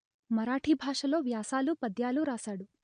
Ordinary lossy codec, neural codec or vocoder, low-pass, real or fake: MP3, 48 kbps; none; 9.9 kHz; real